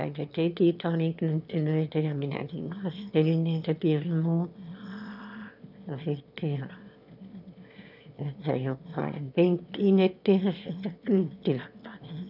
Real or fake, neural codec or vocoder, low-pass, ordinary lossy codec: fake; autoencoder, 22.05 kHz, a latent of 192 numbers a frame, VITS, trained on one speaker; 5.4 kHz; none